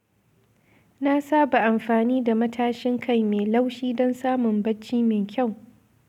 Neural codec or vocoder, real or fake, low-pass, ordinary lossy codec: none; real; 19.8 kHz; none